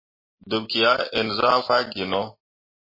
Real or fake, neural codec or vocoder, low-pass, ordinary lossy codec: real; none; 5.4 kHz; MP3, 24 kbps